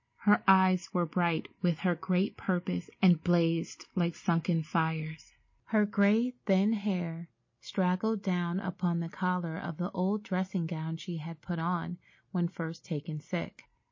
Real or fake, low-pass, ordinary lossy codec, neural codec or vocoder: real; 7.2 kHz; MP3, 32 kbps; none